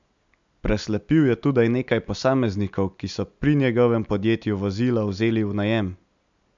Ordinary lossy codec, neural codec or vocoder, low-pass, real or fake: MP3, 64 kbps; none; 7.2 kHz; real